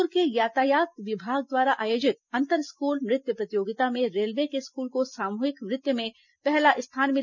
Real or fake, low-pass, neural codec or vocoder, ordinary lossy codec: real; 7.2 kHz; none; none